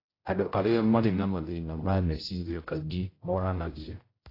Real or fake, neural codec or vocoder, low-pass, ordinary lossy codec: fake; codec, 16 kHz, 0.5 kbps, X-Codec, HuBERT features, trained on general audio; 5.4 kHz; AAC, 24 kbps